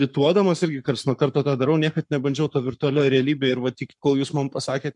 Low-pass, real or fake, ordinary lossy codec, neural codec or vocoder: 10.8 kHz; fake; MP3, 96 kbps; codec, 44.1 kHz, 7.8 kbps, Pupu-Codec